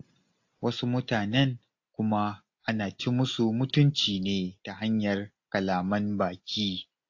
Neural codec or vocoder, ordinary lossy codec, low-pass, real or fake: none; AAC, 48 kbps; 7.2 kHz; real